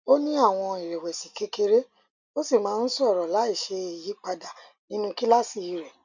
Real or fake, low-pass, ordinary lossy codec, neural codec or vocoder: real; 7.2 kHz; none; none